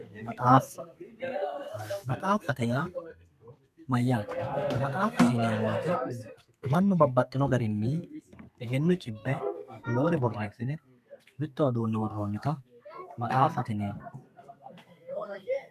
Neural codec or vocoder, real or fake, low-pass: codec, 32 kHz, 1.9 kbps, SNAC; fake; 14.4 kHz